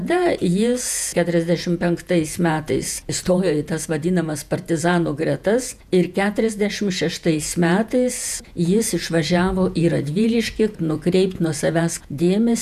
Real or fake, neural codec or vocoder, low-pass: fake; vocoder, 48 kHz, 128 mel bands, Vocos; 14.4 kHz